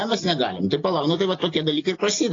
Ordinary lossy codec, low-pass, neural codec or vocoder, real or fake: AAC, 32 kbps; 7.2 kHz; none; real